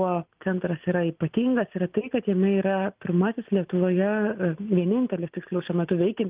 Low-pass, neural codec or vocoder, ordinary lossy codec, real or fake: 3.6 kHz; none; Opus, 32 kbps; real